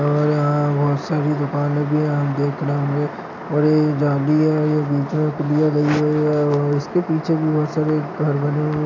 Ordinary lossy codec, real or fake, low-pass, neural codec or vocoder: none; real; 7.2 kHz; none